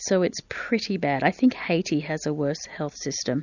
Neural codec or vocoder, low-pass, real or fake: none; 7.2 kHz; real